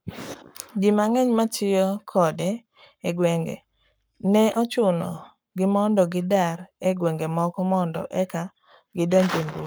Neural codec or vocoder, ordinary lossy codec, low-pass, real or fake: codec, 44.1 kHz, 7.8 kbps, Pupu-Codec; none; none; fake